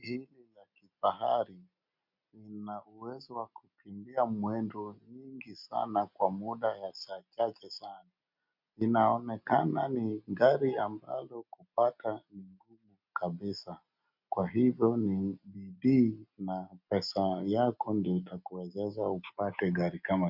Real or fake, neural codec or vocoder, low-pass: real; none; 5.4 kHz